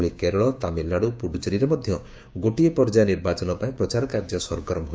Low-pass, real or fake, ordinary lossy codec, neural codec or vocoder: none; fake; none; codec, 16 kHz, 6 kbps, DAC